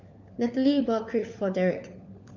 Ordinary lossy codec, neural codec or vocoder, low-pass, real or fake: none; codec, 16 kHz, 4 kbps, FunCodec, trained on LibriTTS, 50 frames a second; 7.2 kHz; fake